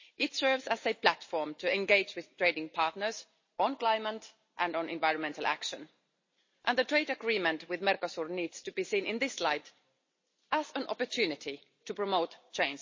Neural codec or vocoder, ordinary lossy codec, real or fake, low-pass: none; MP3, 32 kbps; real; 7.2 kHz